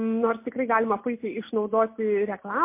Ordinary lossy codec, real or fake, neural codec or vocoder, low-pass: MP3, 24 kbps; real; none; 3.6 kHz